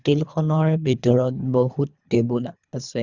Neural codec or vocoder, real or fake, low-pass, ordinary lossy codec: codec, 24 kHz, 3 kbps, HILCodec; fake; 7.2 kHz; Opus, 64 kbps